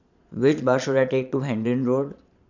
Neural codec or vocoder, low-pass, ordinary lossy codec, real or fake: vocoder, 22.05 kHz, 80 mel bands, Vocos; 7.2 kHz; none; fake